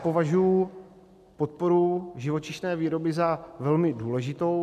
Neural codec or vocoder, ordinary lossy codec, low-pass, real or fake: none; MP3, 96 kbps; 14.4 kHz; real